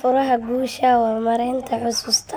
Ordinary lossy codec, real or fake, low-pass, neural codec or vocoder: none; real; none; none